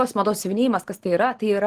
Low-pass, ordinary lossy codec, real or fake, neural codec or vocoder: 14.4 kHz; Opus, 16 kbps; real; none